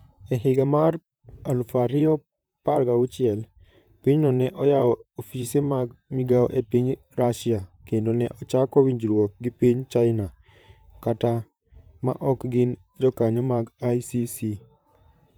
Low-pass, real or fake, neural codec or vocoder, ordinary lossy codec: none; fake; vocoder, 44.1 kHz, 128 mel bands, Pupu-Vocoder; none